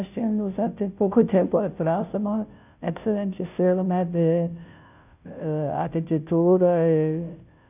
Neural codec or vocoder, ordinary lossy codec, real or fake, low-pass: codec, 16 kHz, 0.5 kbps, FunCodec, trained on Chinese and English, 25 frames a second; none; fake; 3.6 kHz